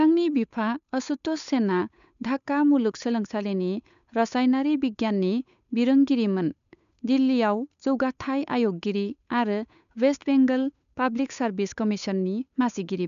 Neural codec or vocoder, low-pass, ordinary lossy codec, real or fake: codec, 16 kHz, 8 kbps, FunCodec, trained on Chinese and English, 25 frames a second; 7.2 kHz; none; fake